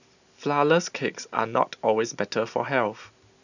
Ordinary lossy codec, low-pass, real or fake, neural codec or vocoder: none; 7.2 kHz; real; none